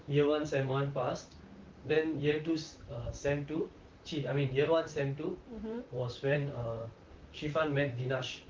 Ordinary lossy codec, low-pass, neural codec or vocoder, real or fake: Opus, 24 kbps; 7.2 kHz; vocoder, 44.1 kHz, 128 mel bands, Pupu-Vocoder; fake